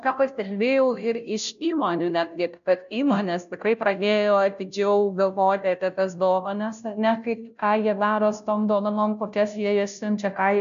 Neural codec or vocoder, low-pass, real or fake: codec, 16 kHz, 0.5 kbps, FunCodec, trained on Chinese and English, 25 frames a second; 7.2 kHz; fake